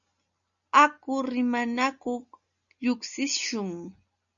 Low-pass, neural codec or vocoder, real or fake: 7.2 kHz; none; real